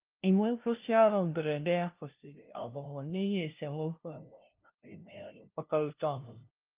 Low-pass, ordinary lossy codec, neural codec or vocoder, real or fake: 3.6 kHz; Opus, 32 kbps; codec, 16 kHz, 0.5 kbps, FunCodec, trained on LibriTTS, 25 frames a second; fake